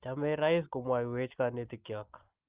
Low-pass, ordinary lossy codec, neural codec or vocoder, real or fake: 3.6 kHz; Opus, 32 kbps; none; real